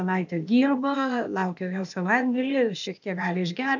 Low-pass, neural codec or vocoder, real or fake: 7.2 kHz; codec, 16 kHz, 0.8 kbps, ZipCodec; fake